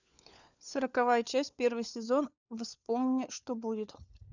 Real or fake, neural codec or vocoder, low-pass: fake; codec, 16 kHz, 4 kbps, FunCodec, trained on LibriTTS, 50 frames a second; 7.2 kHz